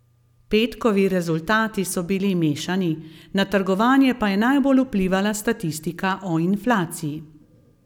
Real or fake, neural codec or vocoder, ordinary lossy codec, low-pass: real; none; none; 19.8 kHz